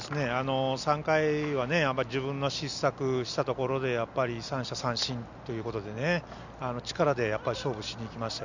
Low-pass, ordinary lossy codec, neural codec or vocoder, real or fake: 7.2 kHz; none; none; real